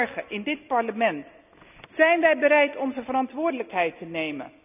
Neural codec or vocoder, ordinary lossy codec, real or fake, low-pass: none; none; real; 3.6 kHz